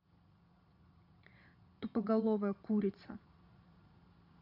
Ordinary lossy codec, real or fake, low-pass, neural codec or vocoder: none; fake; 5.4 kHz; vocoder, 22.05 kHz, 80 mel bands, Vocos